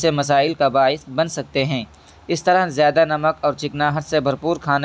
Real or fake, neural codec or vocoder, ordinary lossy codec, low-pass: real; none; none; none